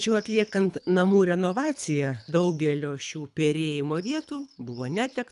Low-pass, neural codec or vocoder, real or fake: 10.8 kHz; codec, 24 kHz, 3 kbps, HILCodec; fake